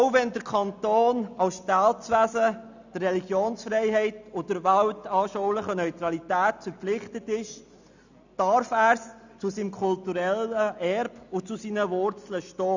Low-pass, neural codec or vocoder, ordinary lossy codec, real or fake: 7.2 kHz; none; none; real